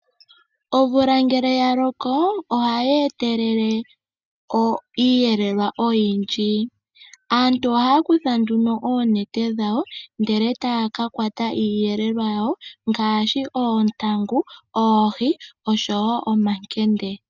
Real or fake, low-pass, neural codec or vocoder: real; 7.2 kHz; none